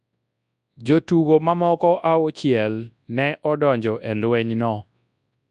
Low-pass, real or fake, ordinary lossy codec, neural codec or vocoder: 10.8 kHz; fake; none; codec, 24 kHz, 0.9 kbps, WavTokenizer, large speech release